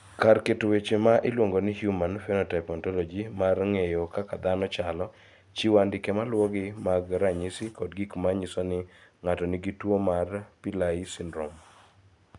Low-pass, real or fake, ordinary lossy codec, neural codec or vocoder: 10.8 kHz; real; none; none